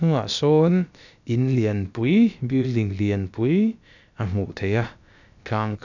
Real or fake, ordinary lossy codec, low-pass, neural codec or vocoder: fake; none; 7.2 kHz; codec, 16 kHz, about 1 kbps, DyCAST, with the encoder's durations